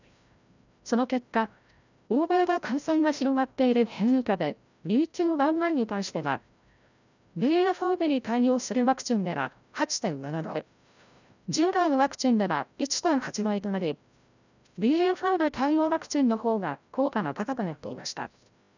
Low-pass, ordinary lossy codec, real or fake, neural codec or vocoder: 7.2 kHz; none; fake; codec, 16 kHz, 0.5 kbps, FreqCodec, larger model